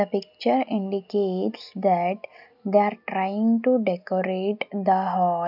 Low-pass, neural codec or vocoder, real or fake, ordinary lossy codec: 5.4 kHz; none; real; none